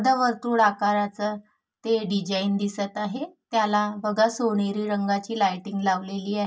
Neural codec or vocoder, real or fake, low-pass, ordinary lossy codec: none; real; none; none